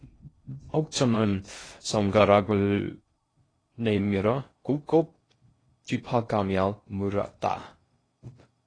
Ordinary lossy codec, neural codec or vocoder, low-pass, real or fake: AAC, 32 kbps; codec, 16 kHz in and 24 kHz out, 0.6 kbps, FocalCodec, streaming, 2048 codes; 9.9 kHz; fake